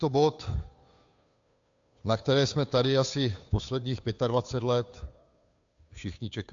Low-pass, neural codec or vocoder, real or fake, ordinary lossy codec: 7.2 kHz; codec, 16 kHz, 2 kbps, FunCodec, trained on Chinese and English, 25 frames a second; fake; AAC, 64 kbps